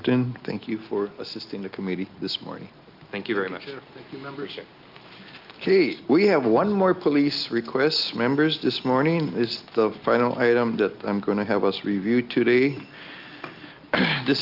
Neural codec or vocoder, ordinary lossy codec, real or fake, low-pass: none; Opus, 24 kbps; real; 5.4 kHz